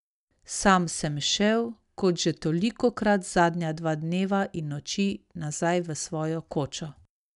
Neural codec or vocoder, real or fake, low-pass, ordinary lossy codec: none; real; 10.8 kHz; none